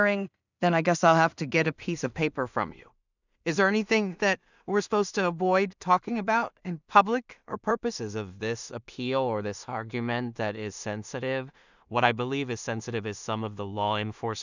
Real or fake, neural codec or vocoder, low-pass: fake; codec, 16 kHz in and 24 kHz out, 0.4 kbps, LongCat-Audio-Codec, two codebook decoder; 7.2 kHz